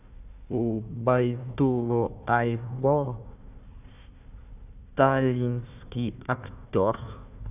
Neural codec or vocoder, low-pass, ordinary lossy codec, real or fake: codec, 16 kHz, 1 kbps, FunCodec, trained on Chinese and English, 50 frames a second; 3.6 kHz; none; fake